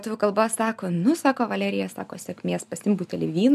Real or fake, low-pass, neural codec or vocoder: real; 14.4 kHz; none